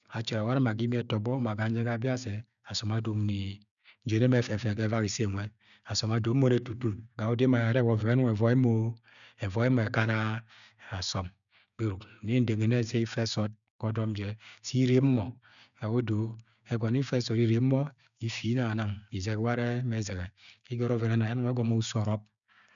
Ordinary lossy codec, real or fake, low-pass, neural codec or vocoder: none; fake; 7.2 kHz; codec, 16 kHz, 6 kbps, DAC